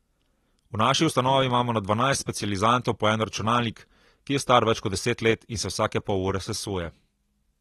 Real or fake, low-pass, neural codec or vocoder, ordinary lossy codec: real; 19.8 kHz; none; AAC, 32 kbps